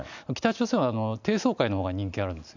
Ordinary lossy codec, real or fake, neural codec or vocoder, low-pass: none; real; none; 7.2 kHz